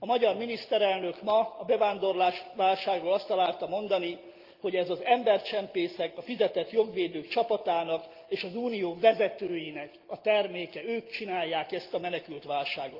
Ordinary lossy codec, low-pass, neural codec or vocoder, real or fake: Opus, 32 kbps; 5.4 kHz; none; real